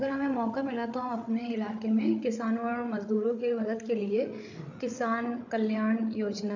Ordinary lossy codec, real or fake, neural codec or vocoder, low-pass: none; fake; codec, 16 kHz, 8 kbps, FreqCodec, larger model; 7.2 kHz